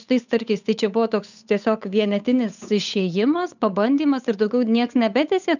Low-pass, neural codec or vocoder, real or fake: 7.2 kHz; codec, 16 kHz, 8 kbps, FunCodec, trained on Chinese and English, 25 frames a second; fake